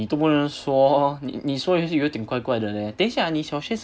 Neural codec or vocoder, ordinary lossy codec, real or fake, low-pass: none; none; real; none